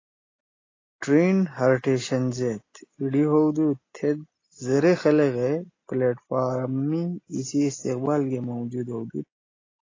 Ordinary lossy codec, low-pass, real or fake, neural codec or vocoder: AAC, 32 kbps; 7.2 kHz; real; none